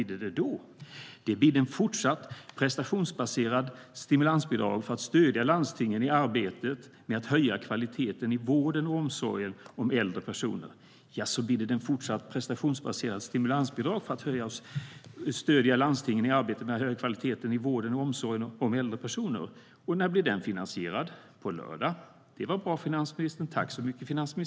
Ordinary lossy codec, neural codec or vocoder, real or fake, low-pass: none; none; real; none